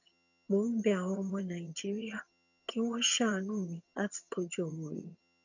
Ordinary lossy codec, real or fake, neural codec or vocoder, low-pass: none; fake; vocoder, 22.05 kHz, 80 mel bands, HiFi-GAN; 7.2 kHz